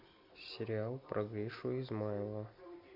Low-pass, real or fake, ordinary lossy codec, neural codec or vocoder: 5.4 kHz; real; MP3, 48 kbps; none